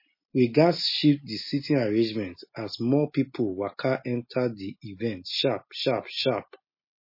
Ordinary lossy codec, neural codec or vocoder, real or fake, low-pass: MP3, 24 kbps; none; real; 5.4 kHz